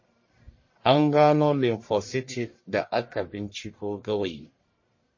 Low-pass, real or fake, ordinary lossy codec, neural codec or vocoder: 7.2 kHz; fake; MP3, 32 kbps; codec, 44.1 kHz, 1.7 kbps, Pupu-Codec